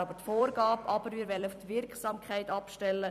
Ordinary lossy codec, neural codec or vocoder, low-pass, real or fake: none; vocoder, 44.1 kHz, 128 mel bands every 256 samples, BigVGAN v2; 14.4 kHz; fake